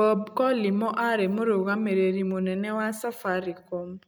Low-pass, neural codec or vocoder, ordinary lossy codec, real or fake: none; none; none; real